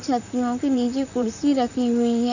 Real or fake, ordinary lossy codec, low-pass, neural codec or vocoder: fake; none; 7.2 kHz; vocoder, 44.1 kHz, 128 mel bands, Pupu-Vocoder